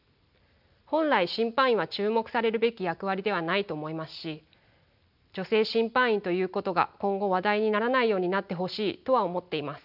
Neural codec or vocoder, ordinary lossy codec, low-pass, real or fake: none; none; 5.4 kHz; real